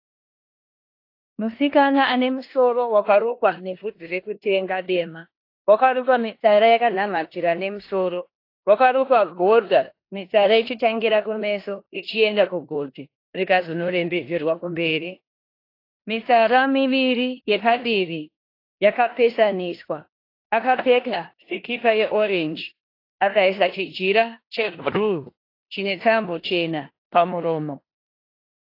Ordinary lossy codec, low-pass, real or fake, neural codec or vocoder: AAC, 32 kbps; 5.4 kHz; fake; codec, 16 kHz in and 24 kHz out, 0.9 kbps, LongCat-Audio-Codec, four codebook decoder